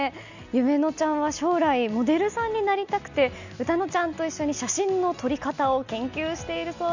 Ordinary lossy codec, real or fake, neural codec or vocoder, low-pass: none; real; none; 7.2 kHz